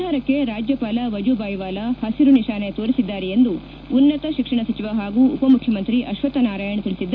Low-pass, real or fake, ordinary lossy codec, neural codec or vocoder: 7.2 kHz; real; none; none